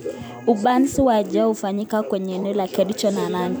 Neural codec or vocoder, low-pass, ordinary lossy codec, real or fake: vocoder, 44.1 kHz, 128 mel bands every 256 samples, BigVGAN v2; none; none; fake